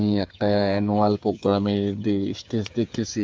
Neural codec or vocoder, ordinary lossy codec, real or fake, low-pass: codec, 16 kHz, 8 kbps, FreqCodec, smaller model; none; fake; none